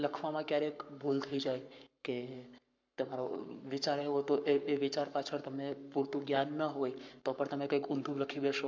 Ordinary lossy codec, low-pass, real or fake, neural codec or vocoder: MP3, 64 kbps; 7.2 kHz; fake; codec, 44.1 kHz, 7.8 kbps, Pupu-Codec